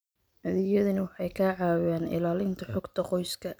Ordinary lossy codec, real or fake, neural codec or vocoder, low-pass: none; real; none; none